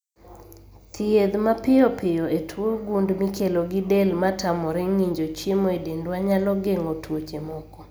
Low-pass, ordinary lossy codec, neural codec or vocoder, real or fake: none; none; none; real